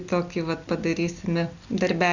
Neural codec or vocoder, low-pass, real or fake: none; 7.2 kHz; real